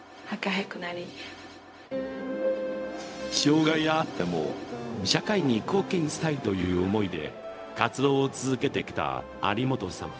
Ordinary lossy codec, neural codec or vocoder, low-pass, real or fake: none; codec, 16 kHz, 0.4 kbps, LongCat-Audio-Codec; none; fake